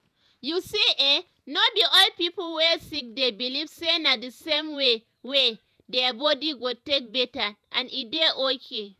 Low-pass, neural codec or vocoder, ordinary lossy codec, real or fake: 14.4 kHz; vocoder, 48 kHz, 128 mel bands, Vocos; none; fake